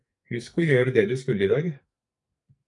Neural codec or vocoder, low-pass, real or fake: codec, 44.1 kHz, 2.6 kbps, SNAC; 10.8 kHz; fake